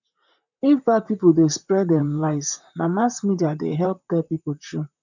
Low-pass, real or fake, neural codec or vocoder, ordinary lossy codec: 7.2 kHz; fake; vocoder, 44.1 kHz, 128 mel bands, Pupu-Vocoder; none